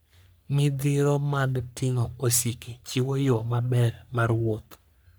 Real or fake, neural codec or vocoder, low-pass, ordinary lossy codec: fake; codec, 44.1 kHz, 3.4 kbps, Pupu-Codec; none; none